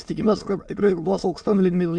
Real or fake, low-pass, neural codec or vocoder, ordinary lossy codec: fake; 9.9 kHz; autoencoder, 22.05 kHz, a latent of 192 numbers a frame, VITS, trained on many speakers; MP3, 64 kbps